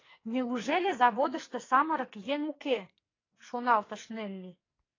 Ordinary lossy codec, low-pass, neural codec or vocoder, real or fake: AAC, 32 kbps; 7.2 kHz; codec, 44.1 kHz, 2.6 kbps, SNAC; fake